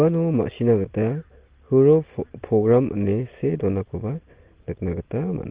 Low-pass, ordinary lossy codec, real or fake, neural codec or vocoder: 3.6 kHz; Opus, 16 kbps; real; none